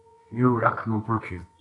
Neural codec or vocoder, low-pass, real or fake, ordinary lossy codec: codec, 24 kHz, 0.9 kbps, WavTokenizer, medium music audio release; 10.8 kHz; fake; AAC, 32 kbps